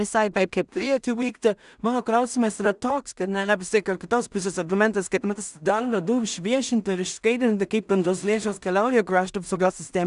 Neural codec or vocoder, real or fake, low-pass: codec, 16 kHz in and 24 kHz out, 0.4 kbps, LongCat-Audio-Codec, two codebook decoder; fake; 10.8 kHz